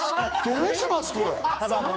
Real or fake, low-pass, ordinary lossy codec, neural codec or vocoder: fake; none; none; codec, 16 kHz, 4 kbps, X-Codec, HuBERT features, trained on general audio